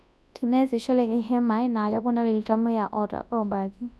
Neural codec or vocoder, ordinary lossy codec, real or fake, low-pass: codec, 24 kHz, 0.9 kbps, WavTokenizer, large speech release; none; fake; none